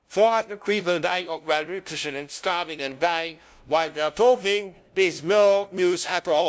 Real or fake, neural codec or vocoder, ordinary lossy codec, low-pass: fake; codec, 16 kHz, 0.5 kbps, FunCodec, trained on LibriTTS, 25 frames a second; none; none